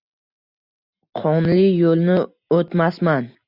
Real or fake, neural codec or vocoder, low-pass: real; none; 5.4 kHz